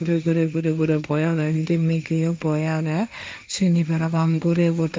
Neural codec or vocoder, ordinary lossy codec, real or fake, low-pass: codec, 16 kHz, 1.1 kbps, Voila-Tokenizer; none; fake; none